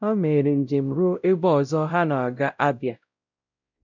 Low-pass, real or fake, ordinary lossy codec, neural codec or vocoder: 7.2 kHz; fake; none; codec, 16 kHz, 0.5 kbps, X-Codec, WavLM features, trained on Multilingual LibriSpeech